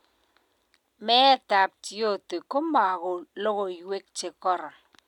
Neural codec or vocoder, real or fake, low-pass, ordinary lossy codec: none; real; 19.8 kHz; none